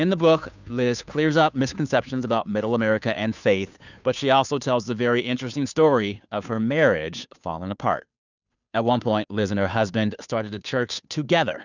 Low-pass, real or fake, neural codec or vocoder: 7.2 kHz; fake; codec, 16 kHz, 2 kbps, FunCodec, trained on Chinese and English, 25 frames a second